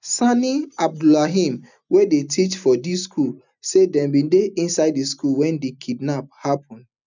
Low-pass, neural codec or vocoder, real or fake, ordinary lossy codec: 7.2 kHz; none; real; none